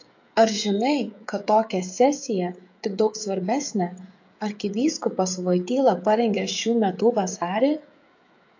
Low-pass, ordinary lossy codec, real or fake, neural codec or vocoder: 7.2 kHz; AAC, 48 kbps; fake; codec, 16 kHz, 16 kbps, FreqCodec, smaller model